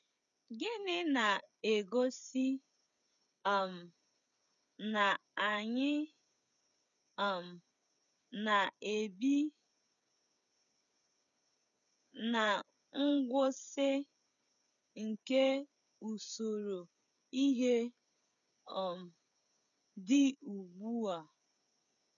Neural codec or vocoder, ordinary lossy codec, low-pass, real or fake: codec, 16 kHz, 8 kbps, FreqCodec, smaller model; none; 7.2 kHz; fake